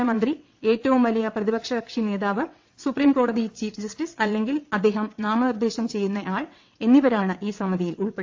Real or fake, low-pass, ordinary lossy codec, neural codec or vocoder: fake; 7.2 kHz; AAC, 48 kbps; vocoder, 22.05 kHz, 80 mel bands, WaveNeXt